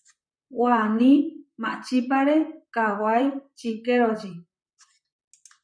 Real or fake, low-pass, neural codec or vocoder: fake; 9.9 kHz; vocoder, 44.1 kHz, 128 mel bands, Pupu-Vocoder